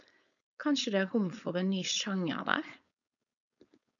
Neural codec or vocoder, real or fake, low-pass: codec, 16 kHz, 4.8 kbps, FACodec; fake; 7.2 kHz